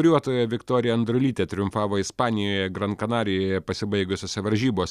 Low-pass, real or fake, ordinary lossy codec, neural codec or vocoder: 14.4 kHz; real; Opus, 64 kbps; none